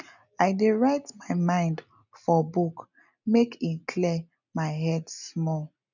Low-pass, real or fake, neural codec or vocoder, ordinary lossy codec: 7.2 kHz; real; none; none